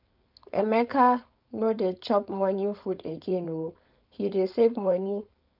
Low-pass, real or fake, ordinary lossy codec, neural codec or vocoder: 5.4 kHz; fake; none; codec, 16 kHz, 4.8 kbps, FACodec